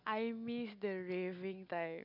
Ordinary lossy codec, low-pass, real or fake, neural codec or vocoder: none; 5.4 kHz; real; none